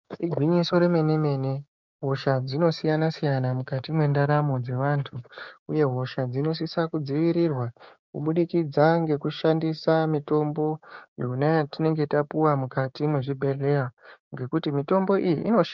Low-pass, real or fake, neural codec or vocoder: 7.2 kHz; fake; codec, 16 kHz, 6 kbps, DAC